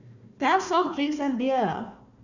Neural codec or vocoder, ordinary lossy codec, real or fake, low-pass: codec, 16 kHz, 1 kbps, FunCodec, trained on Chinese and English, 50 frames a second; none; fake; 7.2 kHz